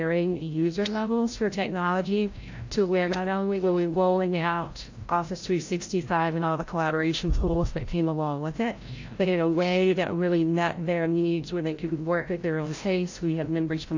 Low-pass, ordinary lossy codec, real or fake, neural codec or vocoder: 7.2 kHz; AAC, 48 kbps; fake; codec, 16 kHz, 0.5 kbps, FreqCodec, larger model